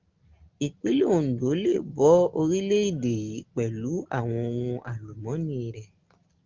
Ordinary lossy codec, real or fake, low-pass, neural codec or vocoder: Opus, 32 kbps; real; 7.2 kHz; none